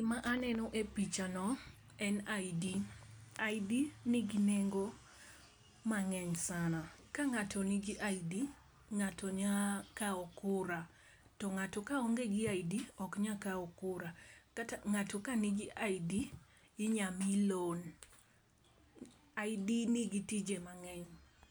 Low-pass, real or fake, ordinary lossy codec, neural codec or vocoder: none; real; none; none